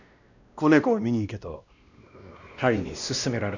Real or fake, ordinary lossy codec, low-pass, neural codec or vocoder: fake; none; 7.2 kHz; codec, 16 kHz, 1 kbps, X-Codec, WavLM features, trained on Multilingual LibriSpeech